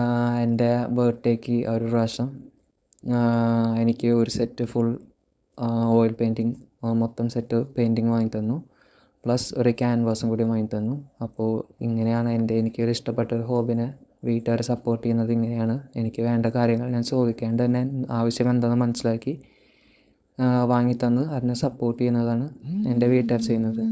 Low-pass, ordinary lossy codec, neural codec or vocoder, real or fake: none; none; codec, 16 kHz, 4.8 kbps, FACodec; fake